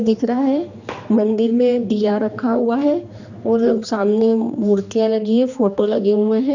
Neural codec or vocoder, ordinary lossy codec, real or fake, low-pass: codec, 16 kHz, 2 kbps, X-Codec, HuBERT features, trained on general audio; none; fake; 7.2 kHz